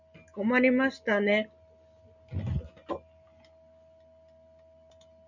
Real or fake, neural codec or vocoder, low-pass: real; none; 7.2 kHz